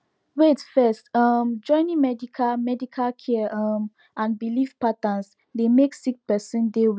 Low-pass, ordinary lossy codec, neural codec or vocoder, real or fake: none; none; none; real